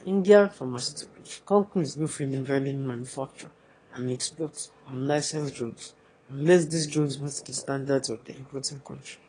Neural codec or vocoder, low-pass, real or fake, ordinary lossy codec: autoencoder, 22.05 kHz, a latent of 192 numbers a frame, VITS, trained on one speaker; 9.9 kHz; fake; AAC, 32 kbps